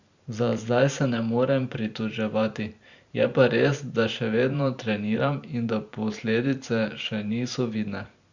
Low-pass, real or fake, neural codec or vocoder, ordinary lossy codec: 7.2 kHz; fake; vocoder, 44.1 kHz, 128 mel bands every 512 samples, BigVGAN v2; Opus, 64 kbps